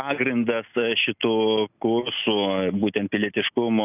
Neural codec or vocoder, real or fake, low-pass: none; real; 3.6 kHz